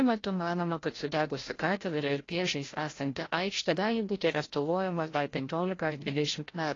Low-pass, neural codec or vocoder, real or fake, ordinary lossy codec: 7.2 kHz; codec, 16 kHz, 0.5 kbps, FreqCodec, larger model; fake; AAC, 32 kbps